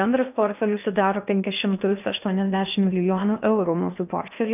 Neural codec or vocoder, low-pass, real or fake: codec, 16 kHz in and 24 kHz out, 0.8 kbps, FocalCodec, streaming, 65536 codes; 3.6 kHz; fake